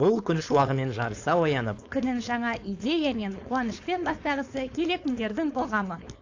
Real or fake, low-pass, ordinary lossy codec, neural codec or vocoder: fake; 7.2 kHz; AAC, 48 kbps; codec, 16 kHz, 4.8 kbps, FACodec